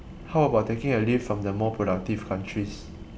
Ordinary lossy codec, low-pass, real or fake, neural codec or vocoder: none; none; real; none